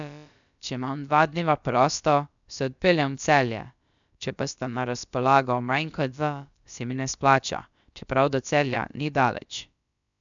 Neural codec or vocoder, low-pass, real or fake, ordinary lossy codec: codec, 16 kHz, about 1 kbps, DyCAST, with the encoder's durations; 7.2 kHz; fake; MP3, 96 kbps